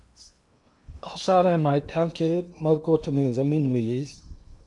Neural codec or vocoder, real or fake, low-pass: codec, 16 kHz in and 24 kHz out, 0.8 kbps, FocalCodec, streaming, 65536 codes; fake; 10.8 kHz